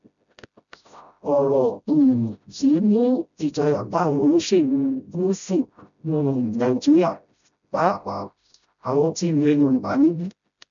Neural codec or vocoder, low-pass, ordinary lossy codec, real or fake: codec, 16 kHz, 0.5 kbps, FreqCodec, smaller model; 7.2 kHz; MP3, 96 kbps; fake